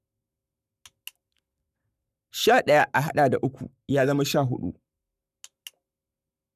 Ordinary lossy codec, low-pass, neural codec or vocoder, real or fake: none; 14.4 kHz; codec, 44.1 kHz, 7.8 kbps, Pupu-Codec; fake